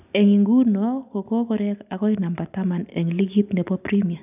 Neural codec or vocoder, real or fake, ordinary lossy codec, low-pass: none; real; none; 3.6 kHz